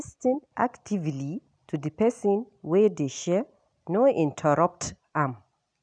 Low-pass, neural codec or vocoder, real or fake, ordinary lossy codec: 9.9 kHz; none; real; none